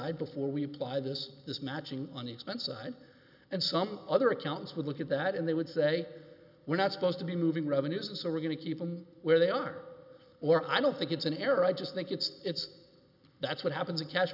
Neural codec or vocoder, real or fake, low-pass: none; real; 5.4 kHz